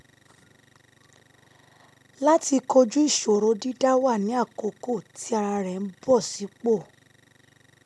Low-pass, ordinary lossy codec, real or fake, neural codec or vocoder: none; none; real; none